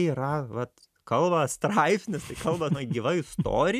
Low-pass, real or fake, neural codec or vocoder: 14.4 kHz; real; none